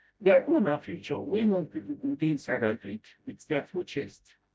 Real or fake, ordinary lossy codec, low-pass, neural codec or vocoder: fake; none; none; codec, 16 kHz, 0.5 kbps, FreqCodec, smaller model